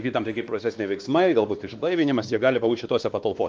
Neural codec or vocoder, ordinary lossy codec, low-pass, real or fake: codec, 16 kHz, 2 kbps, X-Codec, WavLM features, trained on Multilingual LibriSpeech; Opus, 24 kbps; 7.2 kHz; fake